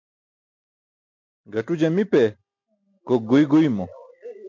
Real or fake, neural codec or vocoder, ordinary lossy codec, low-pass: real; none; MP3, 48 kbps; 7.2 kHz